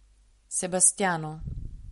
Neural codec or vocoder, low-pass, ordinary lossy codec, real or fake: none; 19.8 kHz; MP3, 48 kbps; real